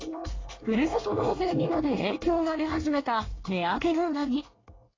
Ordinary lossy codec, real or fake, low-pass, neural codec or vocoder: AAC, 32 kbps; fake; 7.2 kHz; codec, 24 kHz, 1 kbps, SNAC